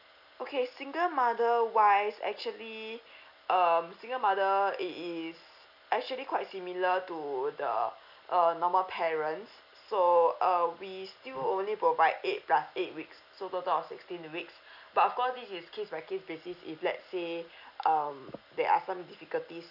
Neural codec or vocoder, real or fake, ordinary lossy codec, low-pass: none; real; none; 5.4 kHz